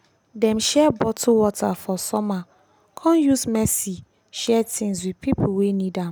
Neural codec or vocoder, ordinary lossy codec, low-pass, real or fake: none; none; none; real